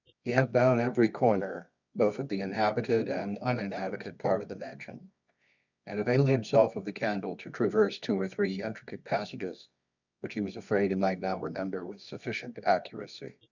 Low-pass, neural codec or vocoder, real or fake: 7.2 kHz; codec, 24 kHz, 0.9 kbps, WavTokenizer, medium music audio release; fake